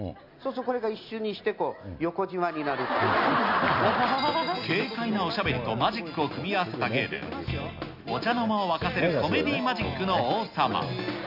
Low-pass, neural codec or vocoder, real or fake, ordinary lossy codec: 5.4 kHz; none; real; none